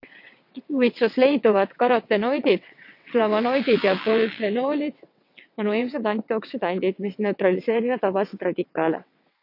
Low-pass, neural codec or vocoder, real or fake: 5.4 kHz; vocoder, 22.05 kHz, 80 mel bands, WaveNeXt; fake